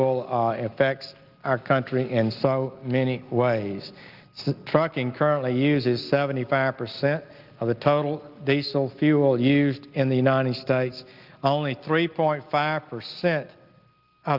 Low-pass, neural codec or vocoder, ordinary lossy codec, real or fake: 5.4 kHz; none; Opus, 32 kbps; real